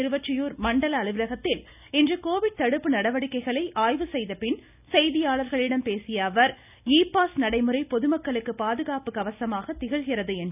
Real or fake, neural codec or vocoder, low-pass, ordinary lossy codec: real; none; 3.6 kHz; none